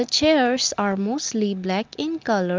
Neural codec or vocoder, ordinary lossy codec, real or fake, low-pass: none; Opus, 32 kbps; real; 7.2 kHz